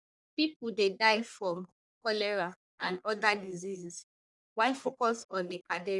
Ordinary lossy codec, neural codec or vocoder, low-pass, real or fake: none; codec, 44.1 kHz, 1.7 kbps, Pupu-Codec; 10.8 kHz; fake